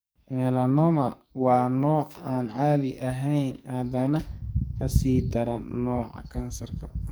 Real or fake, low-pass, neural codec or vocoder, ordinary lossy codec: fake; none; codec, 44.1 kHz, 2.6 kbps, SNAC; none